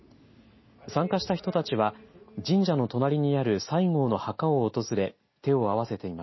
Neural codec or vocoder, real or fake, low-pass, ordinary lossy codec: none; real; 7.2 kHz; MP3, 24 kbps